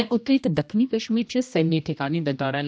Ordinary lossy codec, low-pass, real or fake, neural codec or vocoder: none; none; fake; codec, 16 kHz, 1 kbps, X-Codec, HuBERT features, trained on general audio